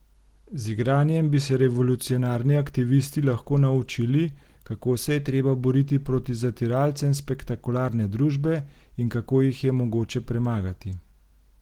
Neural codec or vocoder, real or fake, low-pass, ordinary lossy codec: none; real; 19.8 kHz; Opus, 16 kbps